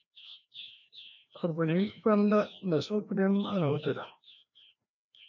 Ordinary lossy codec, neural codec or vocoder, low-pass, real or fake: MP3, 64 kbps; codec, 16 kHz, 1 kbps, FreqCodec, larger model; 7.2 kHz; fake